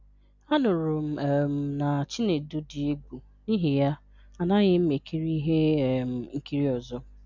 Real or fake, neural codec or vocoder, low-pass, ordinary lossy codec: real; none; 7.2 kHz; none